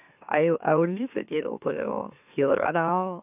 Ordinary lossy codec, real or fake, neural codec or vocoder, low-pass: none; fake; autoencoder, 44.1 kHz, a latent of 192 numbers a frame, MeloTTS; 3.6 kHz